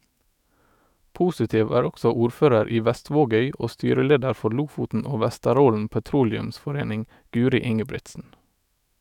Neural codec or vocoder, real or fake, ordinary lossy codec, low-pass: autoencoder, 48 kHz, 128 numbers a frame, DAC-VAE, trained on Japanese speech; fake; none; 19.8 kHz